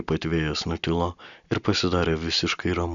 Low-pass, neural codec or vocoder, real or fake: 7.2 kHz; none; real